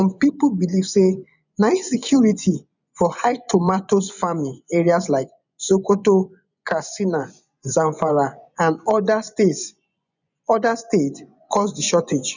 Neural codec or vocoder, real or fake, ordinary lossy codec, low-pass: vocoder, 24 kHz, 100 mel bands, Vocos; fake; none; 7.2 kHz